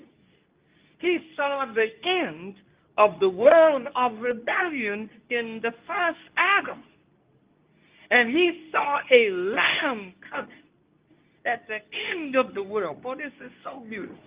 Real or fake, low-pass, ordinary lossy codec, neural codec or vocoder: fake; 3.6 kHz; Opus, 32 kbps; codec, 24 kHz, 0.9 kbps, WavTokenizer, medium speech release version 2